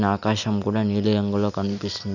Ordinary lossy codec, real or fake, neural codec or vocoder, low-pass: none; real; none; 7.2 kHz